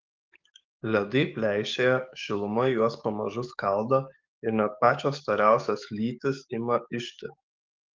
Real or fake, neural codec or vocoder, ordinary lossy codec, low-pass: fake; codec, 44.1 kHz, 7.8 kbps, DAC; Opus, 24 kbps; 7.2 kHz